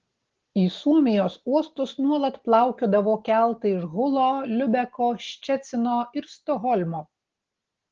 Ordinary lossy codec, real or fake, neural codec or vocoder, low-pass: Opus, 16 kbps; real; none; 7.2 kHz